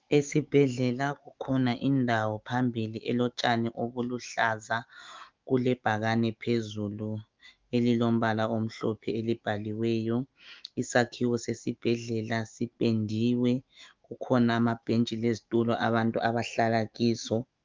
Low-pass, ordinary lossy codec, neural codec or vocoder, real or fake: 7.2 kHz; Opus, 32 kbps; autoencoder, 48 kHz, 128 numbers a frame, DAC-VAE, trained on Japanese speech; fake